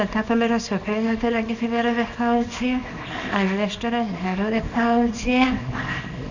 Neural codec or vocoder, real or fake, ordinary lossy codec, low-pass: codec, 24 kHz, 0.9 kbps, WavTokenizer, small release; fake; none; 7.2 kHz